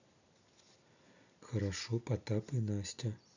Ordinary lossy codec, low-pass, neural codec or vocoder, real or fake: none; 7.2 kHz; none; real